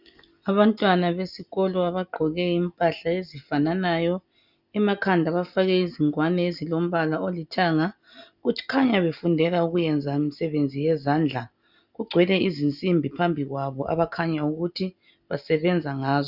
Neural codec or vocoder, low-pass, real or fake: none; 5.4 kHz; real